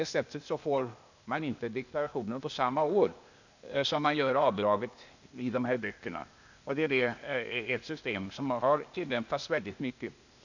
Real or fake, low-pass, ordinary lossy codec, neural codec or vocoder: fake; 7.2 kHz; none; codec, 16 kHz, 0.8 kbps, ZipCodec